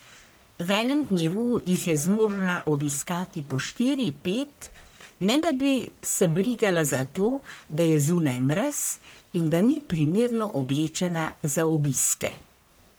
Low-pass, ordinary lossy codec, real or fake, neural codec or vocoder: none; none; fake; codec, 44.1 kHz, 1.7 kbps, Pupu-Codec